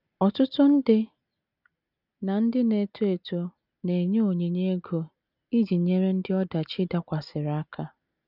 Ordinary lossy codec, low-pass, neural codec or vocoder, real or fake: none; 5.4 kHz; none; real